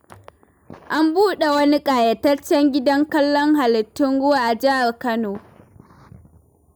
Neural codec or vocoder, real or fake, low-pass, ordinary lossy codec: none; real; none; none